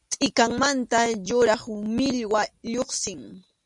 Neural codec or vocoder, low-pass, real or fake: none; 10.8 kHz; real